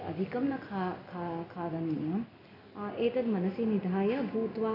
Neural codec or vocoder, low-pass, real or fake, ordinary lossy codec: none; 5.4 kHz; real; AAC, 32 kbps